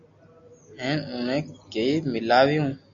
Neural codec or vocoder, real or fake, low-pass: none; real; 7.2 kHz